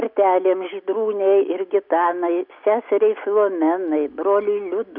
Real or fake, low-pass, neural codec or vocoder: real; 5.4 kHz; none